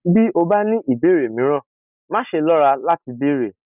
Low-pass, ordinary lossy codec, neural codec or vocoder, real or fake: 3.6 kHz; none; none; real